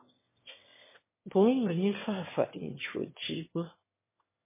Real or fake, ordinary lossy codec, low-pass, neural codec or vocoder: fake; MP3, 16 kbps; 3.6 kHz; autoencoder, 22.05 kHz, a latent of 192 numbers a frame, VITS, trained on one speaker